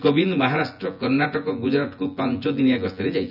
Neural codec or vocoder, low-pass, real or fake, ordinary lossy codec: vocoder, 24 kHz, 100 mel bands, Vocos; 5.4 kHz; fake; none